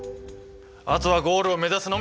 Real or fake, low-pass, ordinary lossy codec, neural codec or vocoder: real; none; none; none